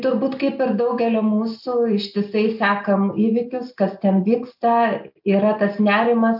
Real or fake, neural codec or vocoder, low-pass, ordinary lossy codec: real; none; 5.4 kHz; AAC, 48 kbps